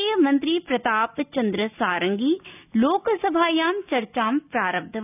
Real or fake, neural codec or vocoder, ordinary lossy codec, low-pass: real; none; none; 3.6 kHz